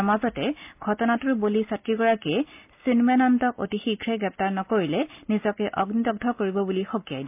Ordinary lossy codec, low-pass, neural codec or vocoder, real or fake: MP3, 32 kbps; 3.6 kHz; none; real